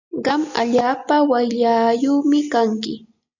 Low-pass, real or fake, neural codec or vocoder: 7.2 kHz; real; none